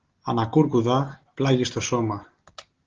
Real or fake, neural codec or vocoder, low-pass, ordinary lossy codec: real; none; 7.2 kHz; Opus, 16 kbps